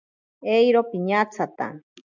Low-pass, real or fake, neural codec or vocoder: 7.2 kHz; real; none